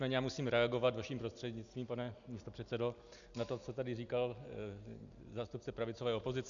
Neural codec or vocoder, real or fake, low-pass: none; real; 7.2 kHz